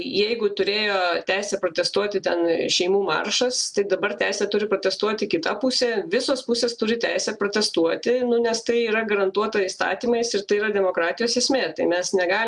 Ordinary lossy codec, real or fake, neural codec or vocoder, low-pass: MP3, 96 kbps; real; none; 10.8 kHz